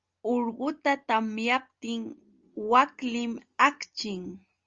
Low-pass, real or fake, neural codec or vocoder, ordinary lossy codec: 7.2 kHz; real; none; Opus, 32 kbps